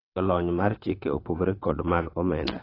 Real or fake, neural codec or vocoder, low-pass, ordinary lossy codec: fake; vocoder, 22.05 kHz, 80 mel bands, WaveNeXt; 5.4 kHz; AAC, 24 kbps